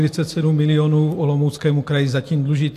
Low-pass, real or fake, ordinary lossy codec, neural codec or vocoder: 14.4 kHz; real; AAC, 64 kbps; none